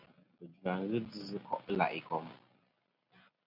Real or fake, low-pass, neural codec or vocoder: real; 5.4 kHz; none